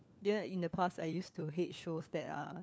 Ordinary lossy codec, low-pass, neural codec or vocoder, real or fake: none; none; codec, 16 kHz, 4 kbps, FunCodec, trained on LibriTTS, 50 frames a second; fake